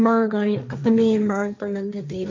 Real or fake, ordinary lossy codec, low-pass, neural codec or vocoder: fake; MP3, 48 kbps; 7.2 kHz; codec, 16 kHz, 1.1 kbps, Voila-Tokenizer